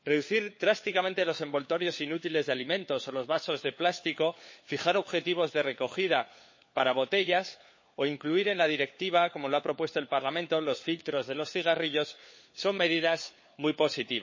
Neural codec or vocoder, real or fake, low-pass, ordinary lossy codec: codec, 16 kHz, 4 kbps, FunCodec, trained on LibriTTS, 50 frames a second; fake; 7.2 kHz; MP3, 32 kbps